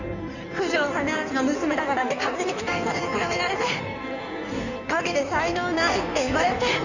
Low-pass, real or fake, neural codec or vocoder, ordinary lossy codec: 7.2 kHz; fake; codec, 16 kHz in and 24 kHz out, 1.1 kbps, FireRedTTS-2 codec; none